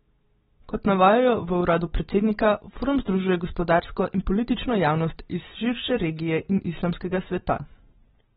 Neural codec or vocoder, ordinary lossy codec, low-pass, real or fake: none; AAC, 16 kbps; 14.4 kHz; real